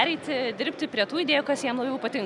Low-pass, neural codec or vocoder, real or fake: 10.8 kHz; none; real